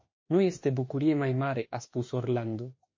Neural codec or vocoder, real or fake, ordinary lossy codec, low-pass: autoencoder, 48 kHz, 32 numbers a frame, DAC-VAE, trained on Japanese speech; fake; MP3, 32 kbps; 7.2 kHz